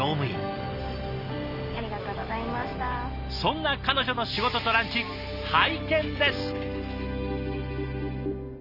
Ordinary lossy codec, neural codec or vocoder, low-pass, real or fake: Opus, 64 kbps; none; 5.4 kHz; real